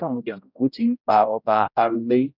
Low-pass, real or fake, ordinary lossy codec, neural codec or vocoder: 5.4 kHz; fake; none; codec, 16 kHz, 0.5 kbps, X-Codec, HuBERT features, trained on general audio